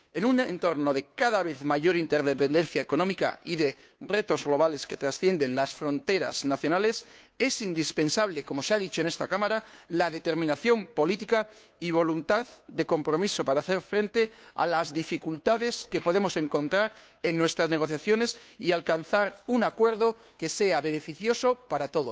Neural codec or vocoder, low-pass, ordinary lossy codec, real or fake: codec, 16 kHz, 2 kbps, FunCodec, trained on Chinese and English, 25 frames a second; none; none; fake